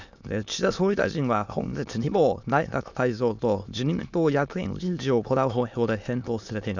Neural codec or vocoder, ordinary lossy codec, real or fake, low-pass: autoencoder, 22.05 kHz, a latent of 192 numbers a frame, VITS, trained on many speakers; none; fake; 7.2 kHz